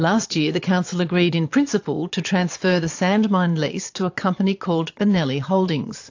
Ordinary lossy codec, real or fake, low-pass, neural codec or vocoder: AAC, 48 kbps; fake; 7.2 kHz; vocoder, 22.05 kHz, 80 mel bands, Vocos